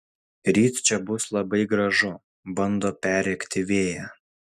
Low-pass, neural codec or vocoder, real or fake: 14.4 kHz; none; real